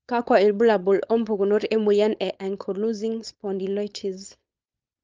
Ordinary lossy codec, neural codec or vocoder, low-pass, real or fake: Opus, 24 kbps; codec, 16 kHz, 4.8 kbps, FACodec; 7.2 kHz; fake